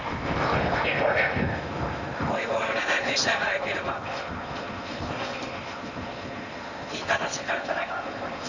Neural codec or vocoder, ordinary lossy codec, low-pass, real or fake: codec, 16 kHz in and 24 kHz out, 0.8 kbps, FocalCodec, streaming, 65536 codes; none; 7.2 kHz; fake